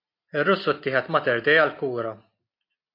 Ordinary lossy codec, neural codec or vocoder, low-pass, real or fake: MP3, 32 kbps; none; 5.4 kHz; real